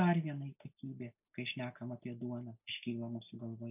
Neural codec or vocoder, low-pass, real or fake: none; 3.6 kHz; real